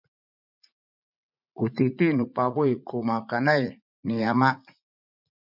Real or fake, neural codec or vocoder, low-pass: fake; vocoder, 44.1 kHz, 80 mel bands, Vocos; 5.4 kHz